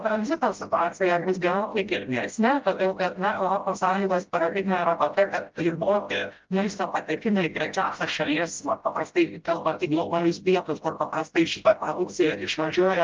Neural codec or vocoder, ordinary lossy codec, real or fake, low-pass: codec, 16 kHz, 0.5 kbps, FreqCodec, smaller model; Opus, 24 kbps; fake; 7.2 kHz